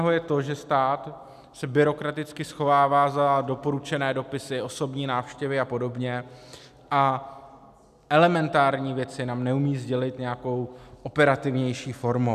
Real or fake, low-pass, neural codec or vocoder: real; 14.4 kHz; none